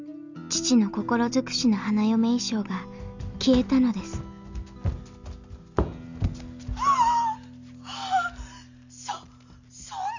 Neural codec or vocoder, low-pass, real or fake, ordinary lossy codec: none; 7.2 kHz; real; none